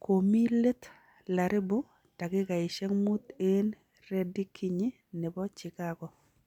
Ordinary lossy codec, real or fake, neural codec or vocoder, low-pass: none; real; none; 19.8 kHz